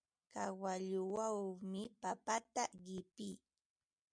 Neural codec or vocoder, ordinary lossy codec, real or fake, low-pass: none; MP3, 96 kbps; real; 9.9 kHz